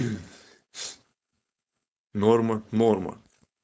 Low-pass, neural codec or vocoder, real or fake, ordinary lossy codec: none; codec, 16 kHz, 4.8 kbps, FACodec; fake; none